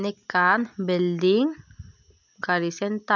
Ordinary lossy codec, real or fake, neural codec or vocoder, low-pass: none; real; none; 7.2 kHz